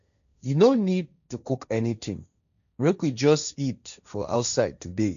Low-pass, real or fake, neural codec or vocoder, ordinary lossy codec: 7.2 kHz; fake; codec, 16 kHz, 1.1 kbps, Voila-Tokenizer; none